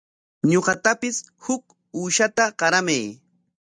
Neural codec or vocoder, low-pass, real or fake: none; 9.9 kHz; real